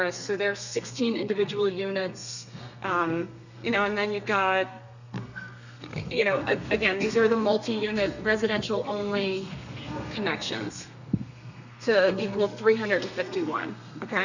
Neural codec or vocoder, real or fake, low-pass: codec, 32 kHz, 1.9 kbps, SNAC; fake; 7.2 kHz